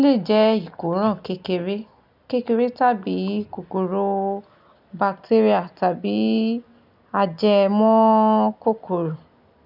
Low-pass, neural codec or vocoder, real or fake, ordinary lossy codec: 5.4 kHz; none; real; none